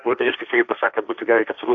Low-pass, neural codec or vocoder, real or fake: 7.2 kHz; codec, 16 kHz, 1.1 kbps, Voila-Tokenizer; fake